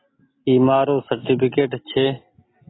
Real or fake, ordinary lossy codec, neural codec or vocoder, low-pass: real; AAC, 16 kbps; none; 7.2 kHz